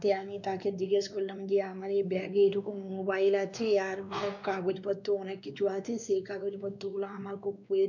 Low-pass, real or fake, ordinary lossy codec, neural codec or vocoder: 7.2 kHz; fake; none; codec, 16 kHz, 2 kbps, X-Codec, WavLM features, trained on Multilingual LibriSpeech